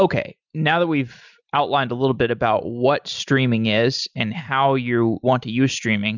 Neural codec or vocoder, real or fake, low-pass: none; real; 7.2 kHz